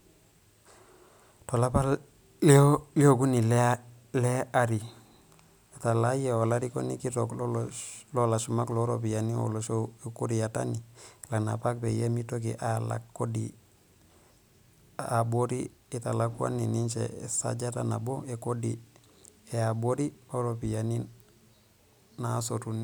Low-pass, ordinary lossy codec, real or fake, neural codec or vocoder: none; none; real; none